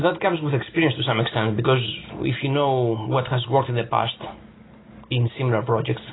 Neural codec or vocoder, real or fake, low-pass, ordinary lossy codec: none; real; 7.2 kHz; AAC, 16 kbps